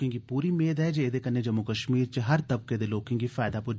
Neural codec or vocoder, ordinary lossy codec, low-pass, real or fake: none; none; none; real